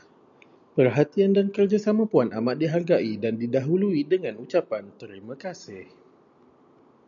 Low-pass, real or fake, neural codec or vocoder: 7.2 kHz; real; none